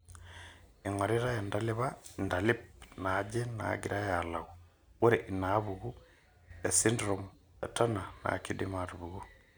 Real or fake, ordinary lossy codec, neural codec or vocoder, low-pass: real; none; none; none